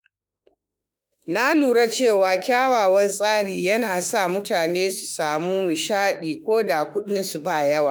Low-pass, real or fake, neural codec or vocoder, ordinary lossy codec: none; fake; autoencoder, 48 kHz, 32 numbers a frame, DAC-VAE, trained on Japanese speech; none